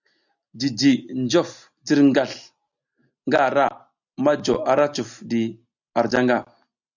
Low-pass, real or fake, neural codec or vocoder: 7.2 kHz; real; none